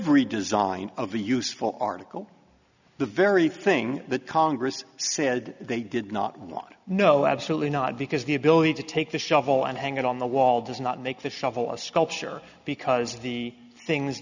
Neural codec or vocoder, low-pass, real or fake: none; 7.2 kHz; real